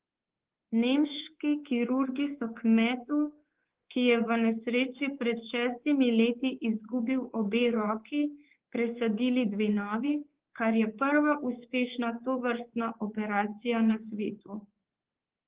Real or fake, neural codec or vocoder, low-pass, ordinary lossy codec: fake; codec, 24 kHz, 3.1 kbps, DualCodec; 3.6 kHz; Opus, 16 kbps